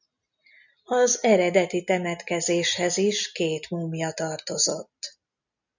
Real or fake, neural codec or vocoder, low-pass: real; none; 7.2 kHz